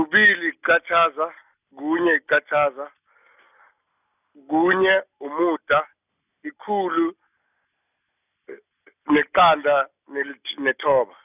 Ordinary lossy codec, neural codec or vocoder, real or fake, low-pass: none; none; real; 3.6 kHz